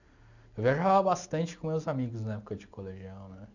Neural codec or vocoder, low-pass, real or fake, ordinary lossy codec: none; 7.2 kHz; real; none